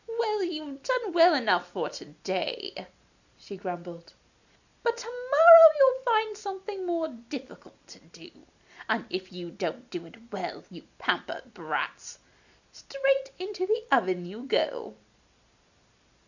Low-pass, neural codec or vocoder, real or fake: 7.2 kHz; none; real